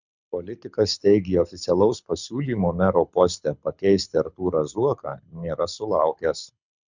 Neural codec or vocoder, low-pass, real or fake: codec, 24 kHz, 6 kbps, HILCodec; 7.2 kHz; fake